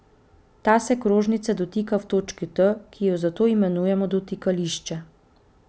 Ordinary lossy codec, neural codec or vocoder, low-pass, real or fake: none; none; none; real